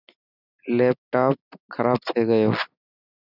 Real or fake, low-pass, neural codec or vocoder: real; 5.4 kHz; none